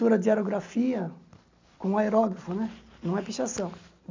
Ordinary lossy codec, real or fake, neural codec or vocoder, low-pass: MP3, 64 kbps; real; none; 7.2 kHz